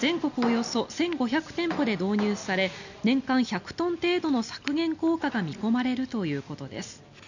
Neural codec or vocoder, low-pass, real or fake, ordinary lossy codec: none; 7.2 kHz; real; none